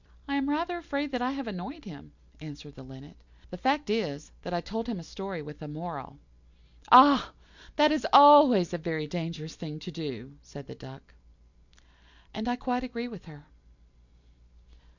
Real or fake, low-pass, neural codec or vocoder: real; 7.2 kHz; none